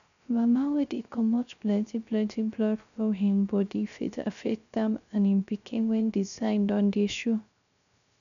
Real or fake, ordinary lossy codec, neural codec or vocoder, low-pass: fake; none; codec, 16 kHz, 0.3 kbps, FocalCodec; 7.2 kHz